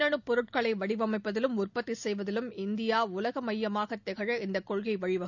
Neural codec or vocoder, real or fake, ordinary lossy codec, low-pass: none; real; none; 7.2 kHz